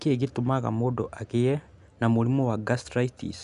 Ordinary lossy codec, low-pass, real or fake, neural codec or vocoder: none; 10.8 kHz; real; none